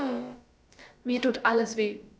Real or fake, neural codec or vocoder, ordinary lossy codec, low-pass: fake; codec, 16 kHz, about 1 kbps, DyCAST, with the encoder's durations; none; none